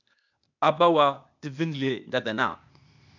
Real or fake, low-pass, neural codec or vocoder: fake; 7.2 kHz; codec, 16 kHz, 0.8 kbps, ZipCodec